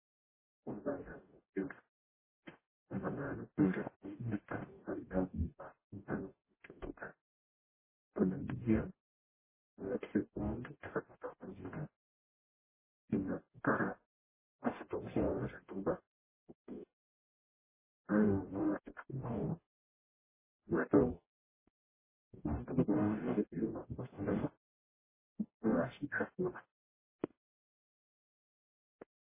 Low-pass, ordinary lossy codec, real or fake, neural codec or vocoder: 3.6 kHz; MP3, 16 kbps; fake; codec, 44.1 kHz, 0.9 kbps, DAC